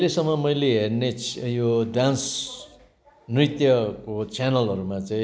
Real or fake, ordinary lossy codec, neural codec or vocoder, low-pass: real; none; none; none